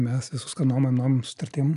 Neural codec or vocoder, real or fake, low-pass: none; real; 10.8 kHz